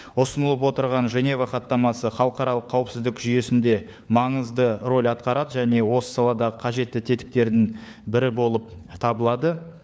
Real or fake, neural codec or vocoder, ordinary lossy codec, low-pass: fake; codec, 16 kHz, 4 kbps, FunCodec, trained on LibriTTS, 50 frames a second; none; none